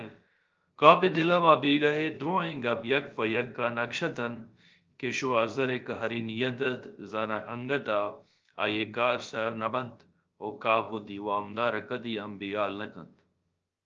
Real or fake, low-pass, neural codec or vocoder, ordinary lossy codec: fake; 7.2 kHz; codec, 16 kHz, about 1 kbps, DyCAST, with the encoder's durations; Opus, 24 kbps